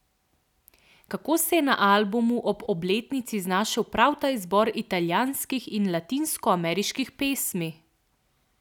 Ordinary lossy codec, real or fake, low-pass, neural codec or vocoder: none; real; 19.8 kHz; none